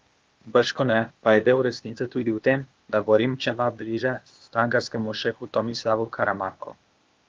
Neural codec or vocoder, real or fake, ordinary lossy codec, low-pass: codec, 16 kHz, 0.8 kbps, ZipCodec; fake; Opus, 24 kbps; 7.2 kHz